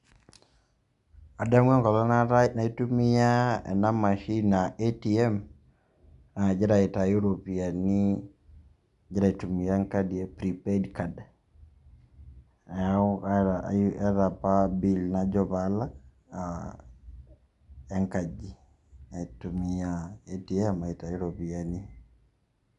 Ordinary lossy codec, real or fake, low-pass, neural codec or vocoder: MP3, 96 kbps; real; 10.8 kHz; none